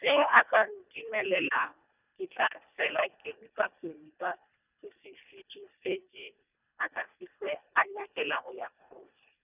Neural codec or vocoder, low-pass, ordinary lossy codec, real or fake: codec, 24 kHz, 1.5 kbps, HILCodec; 3.6 kHz; none; fake